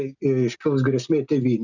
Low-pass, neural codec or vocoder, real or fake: 7.2 kHz; none; real